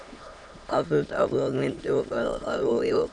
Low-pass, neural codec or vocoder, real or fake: 9.9 kHz; autoencoder, 22.05 kHz, a latent of 192 numbers a frame, VITS, trained on many speakers; fake